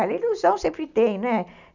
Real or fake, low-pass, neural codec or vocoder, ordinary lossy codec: real; 7.2 kHz; none; none